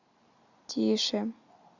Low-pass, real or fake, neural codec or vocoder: 7.2 kHz; real; none